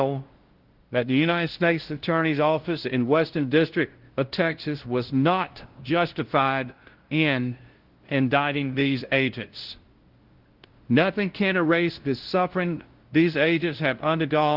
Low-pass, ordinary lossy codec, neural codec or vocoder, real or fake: 5.4 kHz; Opus, 16 kbps; codec, 16 kHz, 0.5 kbps, FunCodec, trained on LibriTTS, 25 frames a second; fake